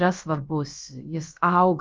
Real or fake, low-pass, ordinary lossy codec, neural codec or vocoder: fake; 7.2 kHz; Opus, 24 kbps; codec, 16 kHz, about 1 kbps, DyCAST, with the encoder's durations